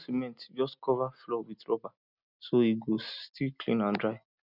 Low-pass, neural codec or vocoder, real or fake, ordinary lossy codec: 5.4 kHz; none; real; none